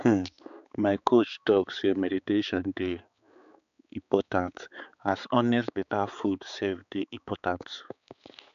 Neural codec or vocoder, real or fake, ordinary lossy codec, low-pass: codec, 16 kHz, 4 kbps, X-Codec, HuBERT features, trained on balanced general audio; fake; MP3, 96 kbps; 7.2 kHz